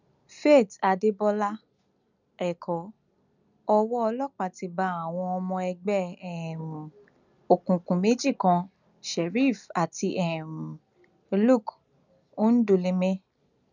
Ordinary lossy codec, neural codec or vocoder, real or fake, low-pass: none; none; real; 7.2 kHz